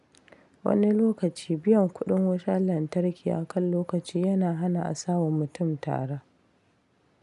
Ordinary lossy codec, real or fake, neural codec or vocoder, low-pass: none; real; none; 10.8 kHz